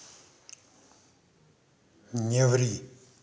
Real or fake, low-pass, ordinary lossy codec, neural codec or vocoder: real; none; none; none